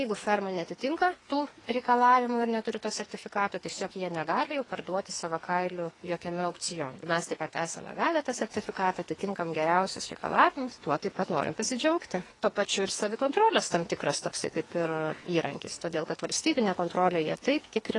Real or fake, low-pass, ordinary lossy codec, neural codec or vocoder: fake; 10.8 kHz; AAC, 32 kbps; codec, 44.1 kHz, 2.6 kbps, SNAC